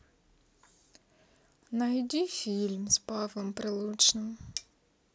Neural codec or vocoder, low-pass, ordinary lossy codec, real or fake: none; none; none; real